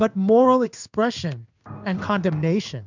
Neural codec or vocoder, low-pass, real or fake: codec, 16 kHz in and 24 kHz out, 1 kbps, XY-Tokenizer; 7.2 kHz; fake